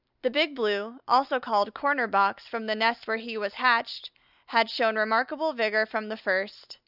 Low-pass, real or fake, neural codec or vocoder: 5.4 kHz; real; none